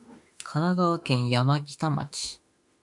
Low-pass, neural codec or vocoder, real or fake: 10.8 kHz; autoencoder, 48 kHz, 32 numbers a frame, DAC-VAE, trained on Japanese speech; fake